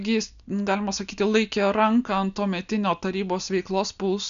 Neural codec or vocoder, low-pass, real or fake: none; 7.2 kHz; real